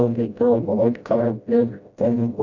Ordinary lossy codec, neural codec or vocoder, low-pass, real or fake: none; codec, 16 kHz, 0.5 kbps, FreqCodec, smaller model; 7.2 kHz; fake